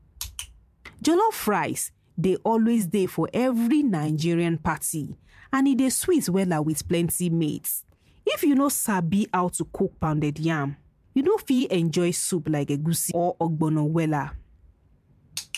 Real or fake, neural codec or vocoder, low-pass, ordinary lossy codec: fake; vocoder, 44.1 kHz, 128 mel bands every 512 samples, BigVGAN v2; 14.4 kHz; MP3, 96 kbps